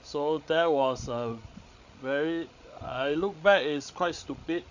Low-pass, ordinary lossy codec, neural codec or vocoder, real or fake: 7.2 kHz; none; codec, 16 kHz, 16 kbps, FreqCodec, larger model; fake